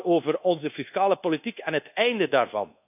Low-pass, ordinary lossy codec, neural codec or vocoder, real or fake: 3.6 kHz; none; codec, 24 kHz, 1.2 kbps, DualCodec; fake